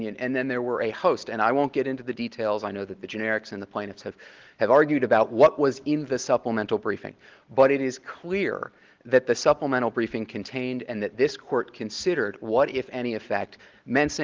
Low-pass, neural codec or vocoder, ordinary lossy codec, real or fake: 7.2 kHz; none; Opus, 16 kbps; real